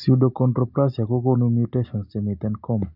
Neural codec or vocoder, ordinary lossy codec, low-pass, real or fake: none; none; 5.4 kHz; real